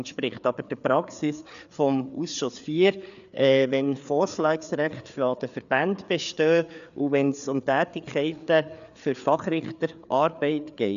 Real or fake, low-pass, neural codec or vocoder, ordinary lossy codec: fake; 7.2 kHz; codec, 16 kHz, 4 kbps, FreqCodec, larger model; none